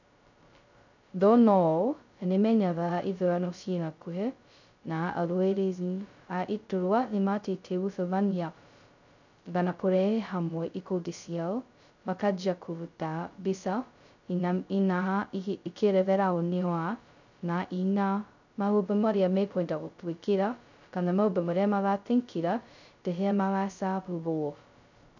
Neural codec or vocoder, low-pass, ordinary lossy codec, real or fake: codec, 16 kHz, 0.2 kbps, FocalCodec; 7.2 kHz; none; fake